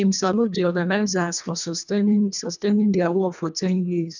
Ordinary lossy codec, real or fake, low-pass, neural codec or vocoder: none; fake; 7.2 kHz; codec, 24 kHz, 1.5 kbps, HILCodec